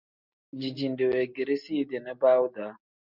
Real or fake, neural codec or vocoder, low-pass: real; none; 5.4 kHz